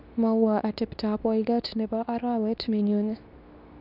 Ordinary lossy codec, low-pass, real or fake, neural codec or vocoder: none; 5.4 kHz; fake; codec, 24 kHz, 0.9 kbps, WavTokenizer, medium speech release version 2